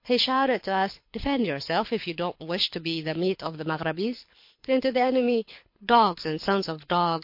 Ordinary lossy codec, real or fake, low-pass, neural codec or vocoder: MP3, 32 kbps; fake; 5.4 kHz; codec, 24 kHz, 6 kbps, HILCodec